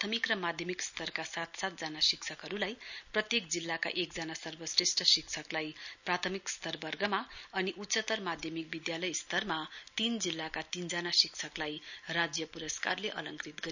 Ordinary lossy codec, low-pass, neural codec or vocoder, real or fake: none; 7.2 kHz; none; real